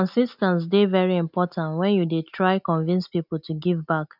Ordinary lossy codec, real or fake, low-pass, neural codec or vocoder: none; real; 5.4 kHz; none